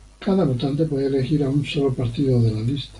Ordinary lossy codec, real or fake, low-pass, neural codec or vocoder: AAC, 64 kbps; real; 10.8 kHz; none